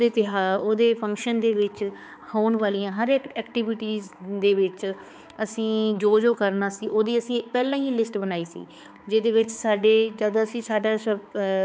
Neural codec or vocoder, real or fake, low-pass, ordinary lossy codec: codec, 16 kHz, 4 kbps, X-Codec, HuBERT features, trained on balanced general audio; fake; none; none